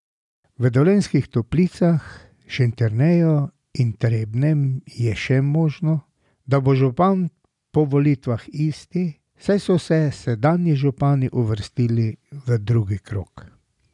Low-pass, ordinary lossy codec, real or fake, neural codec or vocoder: 10.8 kHz; none; real; none